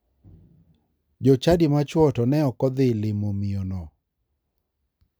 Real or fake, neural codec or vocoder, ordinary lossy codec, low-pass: real; none; none; none